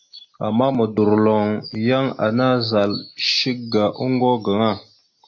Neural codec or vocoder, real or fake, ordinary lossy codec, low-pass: none; real; AAC, 48 kbps; 7.2 kHz